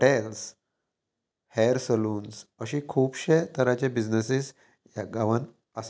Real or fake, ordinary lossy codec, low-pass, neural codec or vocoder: real; none; none; none